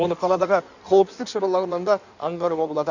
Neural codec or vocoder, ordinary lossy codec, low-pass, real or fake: codec, 16 kHz in and 24 kHz out, 1.1 kbps, FireRedTTS-2 codec; none; 7.2 kHz; fake